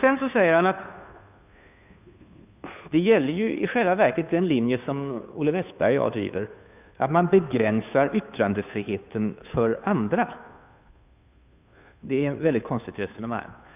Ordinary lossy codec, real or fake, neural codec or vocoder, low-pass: none; fake; codec, 16 kHz, 2 kbps, FunCodec, trained on Chinese and English, 25 frames a second; 3.6 kHz